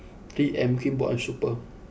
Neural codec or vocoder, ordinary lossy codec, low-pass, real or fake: none; none; none; real